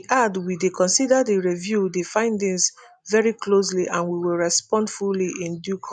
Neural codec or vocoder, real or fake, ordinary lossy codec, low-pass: none; real; none; none